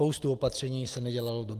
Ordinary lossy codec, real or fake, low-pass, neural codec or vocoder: Opus, 24 kbps; real; 14.4 kHz; none